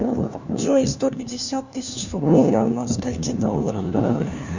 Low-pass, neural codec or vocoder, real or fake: 7.2 kHz; codec, 16 kHz, 1 kbps, FunCodec, trained on LibriTTS, 50 frames a second; fake